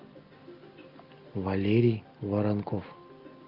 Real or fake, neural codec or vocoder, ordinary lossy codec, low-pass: real; none; Opus, 32 kbps; 5.4 kHz